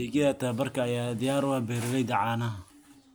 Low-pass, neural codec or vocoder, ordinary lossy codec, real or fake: none; none; none; real